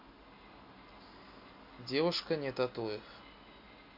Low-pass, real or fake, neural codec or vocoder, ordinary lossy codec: 5.4 kHz; real; none; MP3, 48 kbps